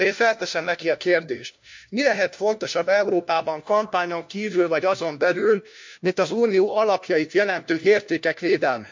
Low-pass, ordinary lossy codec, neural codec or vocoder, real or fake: 7.2 kHz; MP3, 48 kbps; codec, 16 kHz, 1 kbps, FunCodec, trained on LibriTTS, 50 frames a second; fake